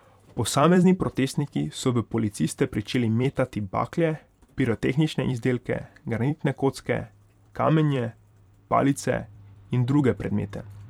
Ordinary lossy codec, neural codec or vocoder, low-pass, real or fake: none; vocoder, 44.1 kHz, 128 mel bands every 256 samples, BigVGAN v2; 19.8 kHz; fake